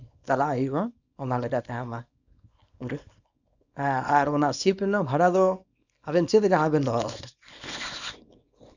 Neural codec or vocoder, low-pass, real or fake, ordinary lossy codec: codec, 24 kHz, 0.9 kbps, WavTokenizer, small release; 7.2 kHz; fake; none